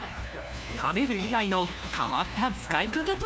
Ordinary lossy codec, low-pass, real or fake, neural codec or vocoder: none; none; fake; codec, 16 kHz, 1 kbps, FunCodec, trained on LibriTTS, 50 frames a second